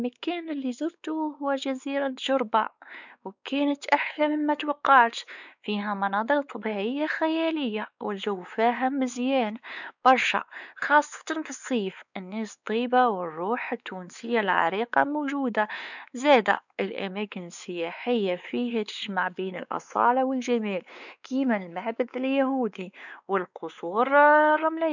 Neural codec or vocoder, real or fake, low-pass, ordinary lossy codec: codec, 16 kHz, 4 kbps, X-Codec, WavLM features, trained on Multilingual LibriSpeech; fake; 7.2 kHz; none